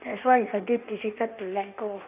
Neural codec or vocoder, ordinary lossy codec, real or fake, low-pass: codec, 16 kHz in and 24 kHz out, 1.1 kbps, FireRedTTS-2 codec; none; fake; 3.6 kHz